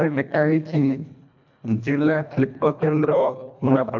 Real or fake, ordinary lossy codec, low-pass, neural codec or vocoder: fake; none; 7.2 kHz; codec, 24 kHz, 1.5 kbps, HILCodec